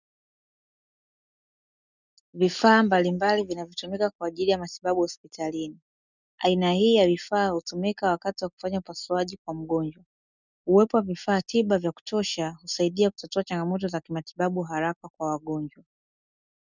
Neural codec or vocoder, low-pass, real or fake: none; 7.2 kHz; real